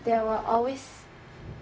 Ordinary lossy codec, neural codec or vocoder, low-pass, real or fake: none; codec, 16 kHz, 0.4 kbps, LongCat-Audio-Codec; none; fake